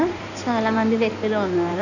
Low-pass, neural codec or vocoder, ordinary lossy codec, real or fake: 7.2 kHz; codec, 16 kHz in and 24 kHz out, 2.2 kbps, FireRedTTS-2 codec; none; fake